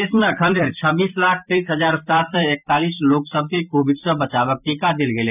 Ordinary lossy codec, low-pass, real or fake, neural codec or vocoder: none; 3.6 kHz; fake; vocoder, 44.1 kHz, 128 mel bands every 256 samples, BigVGAN v2